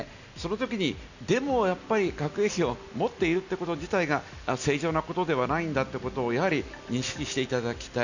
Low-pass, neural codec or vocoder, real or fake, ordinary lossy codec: 7.2 kHz; none; real; none